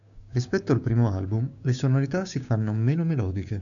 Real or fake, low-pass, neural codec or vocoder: fake; 7.2 kHz; codec, 16 kHz, 6 kbps, DAC